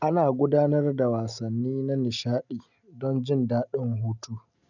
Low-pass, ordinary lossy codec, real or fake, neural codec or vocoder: 7.2 kHz; none; real; none